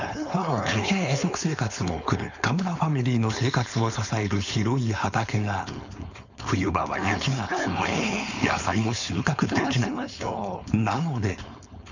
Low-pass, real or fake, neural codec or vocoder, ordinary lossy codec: 7.2 kHz; fake; codec, 16 kHz, 4.8 kbps, FACodec; none